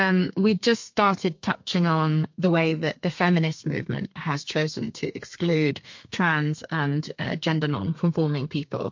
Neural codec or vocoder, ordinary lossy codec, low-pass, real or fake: codec, 32 kHz, 1.9 kbps, SNAC; MP3, 48 kbps; 7.2 kHz; fake